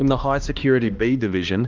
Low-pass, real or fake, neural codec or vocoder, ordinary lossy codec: 7.2 kHz; fake; codec, 16 kHz, 1 kbps, X-Codec, HuBERT features, trained on balanced general audio; Opus, 24 kbps